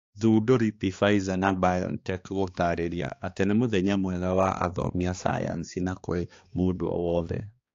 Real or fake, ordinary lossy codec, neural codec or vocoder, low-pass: fake; AAC, 48 kbps; codec, 16 kHz, 2 kbps, X-Codec, HuBERT features, trained on balanced general audio; 7.2 kHz